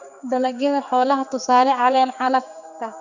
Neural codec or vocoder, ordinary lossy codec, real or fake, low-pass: codec, 16 kHz, 4 kbps, X-Codec, HuBERT features, trained on general audio; none; fake; 7.2 kHz